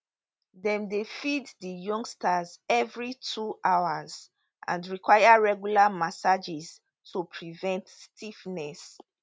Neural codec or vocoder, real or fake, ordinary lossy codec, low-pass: none; real; none; none